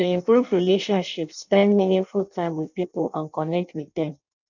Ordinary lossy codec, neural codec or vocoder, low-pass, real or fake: none; codec, 16 kHz in and 24 kHz out, 0.6 kbps, FireRedTTS-2 codec; 7.2 kHz; fake